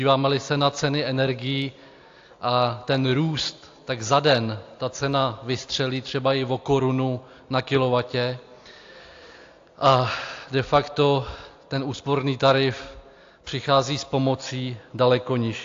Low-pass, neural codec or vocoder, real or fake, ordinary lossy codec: 7.2 kHz; none; real; AAC, 48 kbps